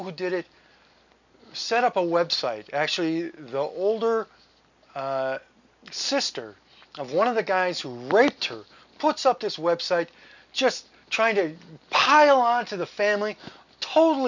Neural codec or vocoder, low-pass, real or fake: none; 7.2 kHz; real